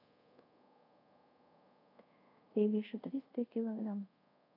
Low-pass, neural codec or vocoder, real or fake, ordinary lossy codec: 5.4 kHz; codec, 24 kHz, 0.5 kbps, DualCodec; fake; none